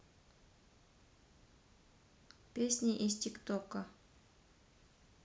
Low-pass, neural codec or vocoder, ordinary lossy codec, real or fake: none; none; none; real